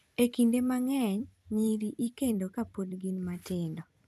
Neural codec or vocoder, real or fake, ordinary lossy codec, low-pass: vocoder, 44.1 kHz, 128 mel bands every 256 samples, BigVGAN v2; fake; none; 14.4 kHz